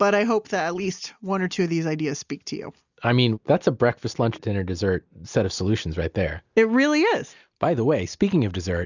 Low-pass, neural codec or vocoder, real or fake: 7.2 kHz; none; real